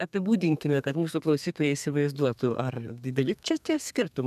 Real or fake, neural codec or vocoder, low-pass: fake; codec, 32 kHz, 1.9 kbps, SNAC; 14.4 kHz